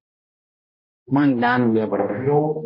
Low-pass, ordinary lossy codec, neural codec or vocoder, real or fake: 5.4 kHz; MP3, 24 kbps; codec, 16 kHz, 1 kbps, X-Codec, HuBERT features, trained on balanced general audio; fake